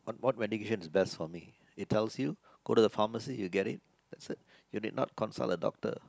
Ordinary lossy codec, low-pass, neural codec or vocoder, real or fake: none; none; none; real